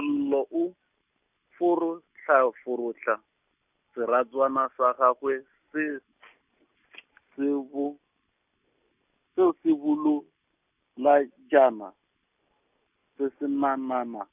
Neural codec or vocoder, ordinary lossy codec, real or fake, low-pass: none; none; real; 3.6 kHz